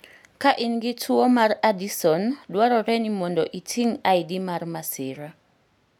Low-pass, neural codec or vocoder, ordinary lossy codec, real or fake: 19.8 kHz; none; none; real